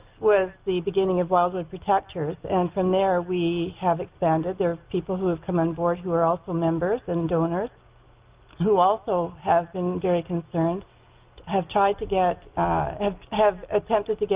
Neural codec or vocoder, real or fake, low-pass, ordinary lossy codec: none; real; 3.6 kHz; Opus, 32 kbps